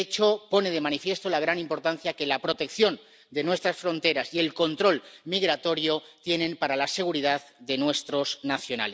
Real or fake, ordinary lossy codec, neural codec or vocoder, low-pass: real; none; none; none